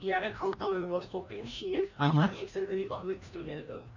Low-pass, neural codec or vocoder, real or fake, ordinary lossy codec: 7.2 kHz; codec, 16 kHz, 1 kbps, FreqCodec, larger model; fake; none